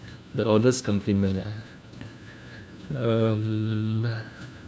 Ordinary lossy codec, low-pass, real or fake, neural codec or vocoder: none; none; fake; codec, 16 kHz, 1 kbps, FunCodec, trained on LibriTTS, 50 frames a second